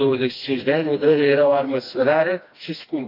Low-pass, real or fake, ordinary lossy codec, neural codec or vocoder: 5.4 kHz; fake; AAC, 32 kbps; codec, 16 kHz, 1 kbps, FreqCodec, smaller model